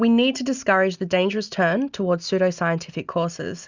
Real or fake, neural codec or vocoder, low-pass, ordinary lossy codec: real; none; 7.2 kHz; Opus, 64 kbps